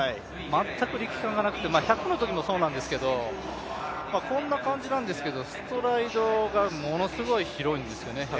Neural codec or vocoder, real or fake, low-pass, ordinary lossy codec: none; real; none; none